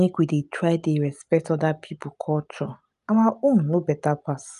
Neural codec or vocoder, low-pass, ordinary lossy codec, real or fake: none; 10.8 kHz; none; real